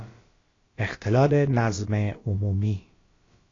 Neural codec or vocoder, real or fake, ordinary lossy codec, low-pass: codec, 16 kHz, about 1 kbps, DyCAST, with the encoder's durations; fake; AAC, 32 kbps; 7.2 kHz